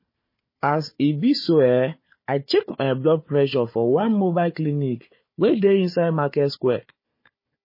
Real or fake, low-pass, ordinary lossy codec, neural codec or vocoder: fake; 5.4 kHz; MP3, 24 kbps; codec, 16 kHz, 4 kbps, FunCodec, trained on Chinese and English, 50 frames a second